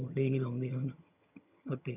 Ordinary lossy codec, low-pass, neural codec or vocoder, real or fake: none; 3.6 kHz; vocoder, 22.05 kHz, 80 mel bands, HiFi-GAN; fake